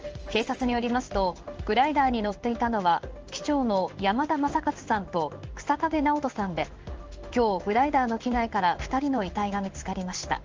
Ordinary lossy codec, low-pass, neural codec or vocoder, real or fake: Opus, 24 kbps; 7.2 kHz; codec, 16 kHz in and 24 kHz out, 1 kbps, XY-Tokenizer; fake